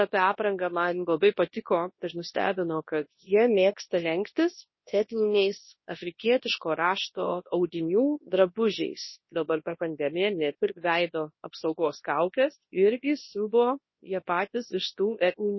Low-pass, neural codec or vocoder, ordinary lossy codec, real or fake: 7.2 kHz; codec, 24 kHz, 0.9 kbps, WavTokenizer, large speech release; MP3, 24 kbps; fake